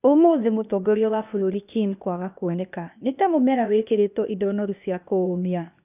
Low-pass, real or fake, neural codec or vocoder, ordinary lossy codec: 3.6 kHz; fake; codec, 16 kHz, 0.8 kbps, ZipCodec; none